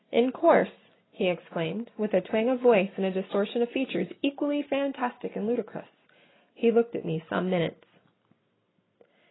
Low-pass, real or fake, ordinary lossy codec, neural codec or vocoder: 7.2 kHz; real; AAC, 16 kbps; none